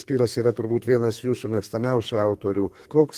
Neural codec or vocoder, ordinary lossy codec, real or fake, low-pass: codec, 32 kHz, 1.9 kbps, SNAC; Opus, 24 kbps; fake; 14.4 kHz